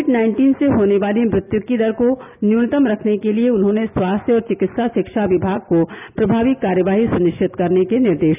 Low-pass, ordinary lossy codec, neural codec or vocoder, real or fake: 3.6 kHz; none; none; real